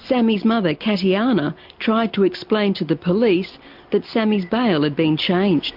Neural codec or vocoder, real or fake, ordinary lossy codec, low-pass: none; real; MP3, 48 kbps; 5.4 kHz